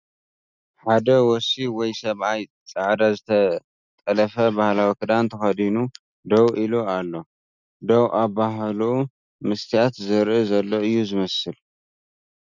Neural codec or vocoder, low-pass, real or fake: none; 7.2 kHz; real